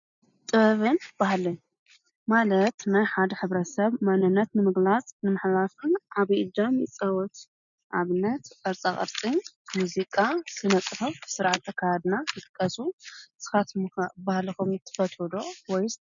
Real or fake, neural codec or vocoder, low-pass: real; none; 7.2 kHz